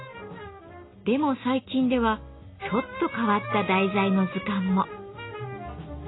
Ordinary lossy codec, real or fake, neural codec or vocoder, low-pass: AAC, 16 kbps; real; none; 7.2 kHz